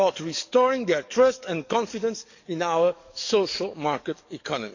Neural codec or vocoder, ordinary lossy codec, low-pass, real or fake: codec, 44.1 kHz, 7.8 kbps, DAC; none; 7.2 kHz; fake